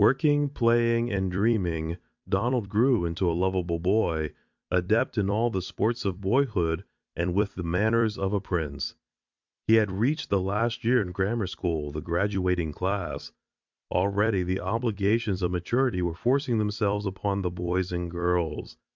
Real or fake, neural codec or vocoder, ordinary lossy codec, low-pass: fake; vocoder, 44.1 kHz, 128 mel bands every 256 samples, BigVGAN v2; Opus, 64 kbps; 7.2 kHz